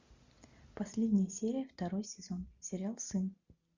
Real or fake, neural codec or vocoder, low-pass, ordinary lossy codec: real; none; 7.2 kHz; Opus, 64 kbps